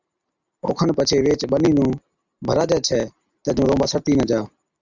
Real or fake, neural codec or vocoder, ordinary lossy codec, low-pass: real; none; Opus, 64 kbps; 7.2 kHz